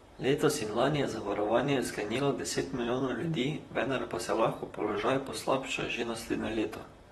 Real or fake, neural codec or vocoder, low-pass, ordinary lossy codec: fake; vocoder, 44.1 kHz, 128 mel bands, Pupu-Vocoder; 19.8 kHz; AAC, 32 kbps